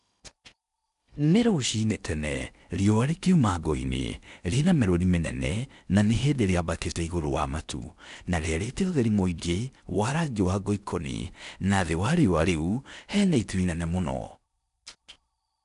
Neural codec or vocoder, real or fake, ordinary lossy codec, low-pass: codec, 16 kHz in and 24 kHz out, 0.8 kbps, FocalCodec, streaming, 65536 codes; fake; none; 10.8 kHz